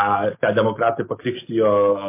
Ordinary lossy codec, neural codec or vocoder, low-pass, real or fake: MP3, 24 kbps; none; 3.6 kHz; real